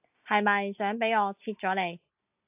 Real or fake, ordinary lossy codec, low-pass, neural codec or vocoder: real; AAC, 32 kbps; 3.6 kHz; none